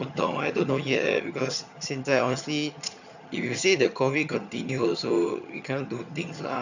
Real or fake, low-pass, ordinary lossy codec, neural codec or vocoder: fake; 7.2 kHz; none; vocoder, 22.05 kHz, 80 mel bands, HiFi-GAN